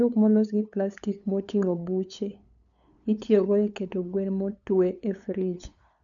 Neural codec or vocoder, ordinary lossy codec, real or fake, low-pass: codec, 16 kHz, 8 kbps, FunCodec, trained on LibriTTS, 25 frames a second; none; fake; 7.2 kHz